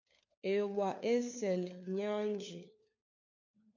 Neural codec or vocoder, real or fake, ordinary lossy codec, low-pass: codec, 16 kHz, 4 kbps, FunCodec, trained on LibriTTS, 50 frames a second; fake; MP3, 48 kbps; 7.2 kHz